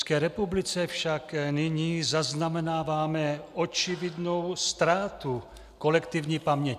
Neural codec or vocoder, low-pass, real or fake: none; 14.4 kHz; real